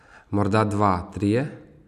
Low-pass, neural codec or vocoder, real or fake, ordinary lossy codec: 14.4 kHz; none; real; none